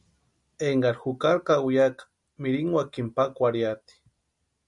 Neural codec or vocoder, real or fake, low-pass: none; real; 10.8 kHz